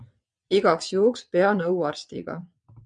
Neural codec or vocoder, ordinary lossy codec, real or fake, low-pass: vocoder, 22.05 kHz, 80 mel bands, WaveNeXt; MP3, 96 kbps; fake; 9.9 kHz